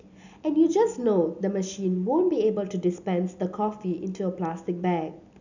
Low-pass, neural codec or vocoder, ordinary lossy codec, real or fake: 7.2 kHz; none; none; real